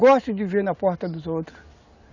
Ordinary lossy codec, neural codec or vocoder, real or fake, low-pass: none; none; real; 7.2 kHz